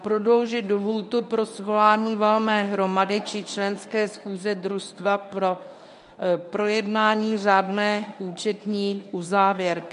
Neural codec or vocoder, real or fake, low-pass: codec, 24 kHz, 0.9 kbps, WavTokenizer, medium speech release version 1; fake; 10.8 kHz